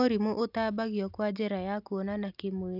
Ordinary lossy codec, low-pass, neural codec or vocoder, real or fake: AAC, 48 kbps; 5.4 kHz; none; real